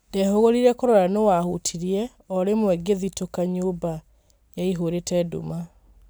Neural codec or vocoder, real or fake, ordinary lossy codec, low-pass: none; real; none; none